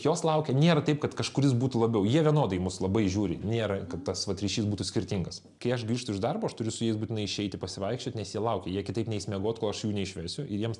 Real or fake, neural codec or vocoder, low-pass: real; none; 10.8 kHz